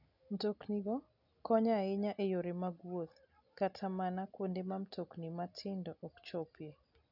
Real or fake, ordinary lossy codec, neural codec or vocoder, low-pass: real; none; none; 5.4 kHz